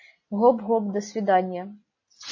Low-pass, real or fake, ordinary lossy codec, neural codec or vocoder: 7.2 kHz; real; MP3, 32 kbps; none